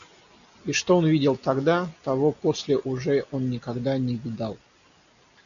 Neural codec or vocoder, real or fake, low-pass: none; real; 7.2 kHz